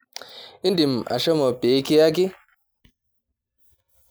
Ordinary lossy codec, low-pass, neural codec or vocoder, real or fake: none; none; none; real